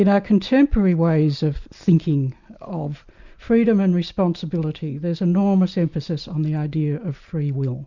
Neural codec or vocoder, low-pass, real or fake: none; 7.2 kHz; real